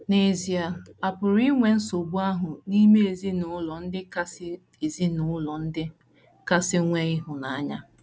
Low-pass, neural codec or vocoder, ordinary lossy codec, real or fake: none; none; none; real